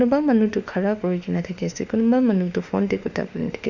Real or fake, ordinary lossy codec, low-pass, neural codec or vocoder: fake; none; 7.2 kHz; autoencoder, 48 kHz, 32 numbers a frame, DAC-VAE, trained on Japanese speech